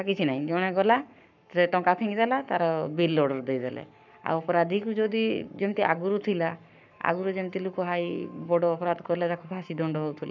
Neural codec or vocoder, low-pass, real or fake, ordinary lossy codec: codec, 44.1 kHz, 7.8 kbps, Pupu-Codec; 7.2 kHz; fake; none